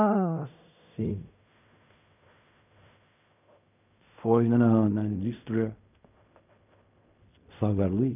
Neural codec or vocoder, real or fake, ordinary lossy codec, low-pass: codec, 16 kHz in and 24 kHz out, 0.4 kbps, LongCat-Audio-Codec, fine tuned four codebook decoder; fake; none; 3.6 kHz